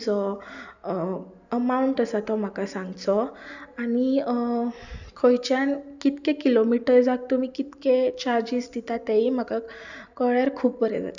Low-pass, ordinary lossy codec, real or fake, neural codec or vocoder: 7.2 kHz; none; real; none